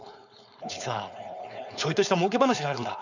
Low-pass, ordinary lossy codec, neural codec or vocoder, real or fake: 7.2 kHz; none; codec, 16 kHz, 4.8 kbps, FACodec; fake